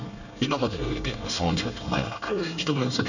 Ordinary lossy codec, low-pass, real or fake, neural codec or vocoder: none; 7.2 kHz; fake; codec, 24 kHz, 1 kbps, SNAC